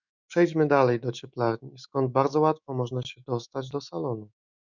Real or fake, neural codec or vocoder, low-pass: fake; autoencoder, 48 kHz, 128 numbers a frame, DAC-VAE, trained on Japanese speech; 7.2 kHz